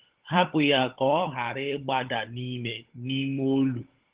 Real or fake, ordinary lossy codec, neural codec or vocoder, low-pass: fake; Opus, 16 kbps; codec, 16 kHz, 16 kbps, FunCodec, trained on LibriTTS, 50 frames a second; 3.6 kHz